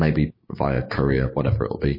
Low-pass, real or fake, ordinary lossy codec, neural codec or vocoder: 5.4 kHz; fake; MP3, 24 kbps; codec, 16 kHz, 6 kbps, DAC